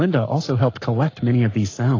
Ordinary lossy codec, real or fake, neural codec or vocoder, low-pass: AAC, 32 kbps; fake; codec, 44.1 kHz, 7.8 kbps, Pupu-Codec; 7.2 kHz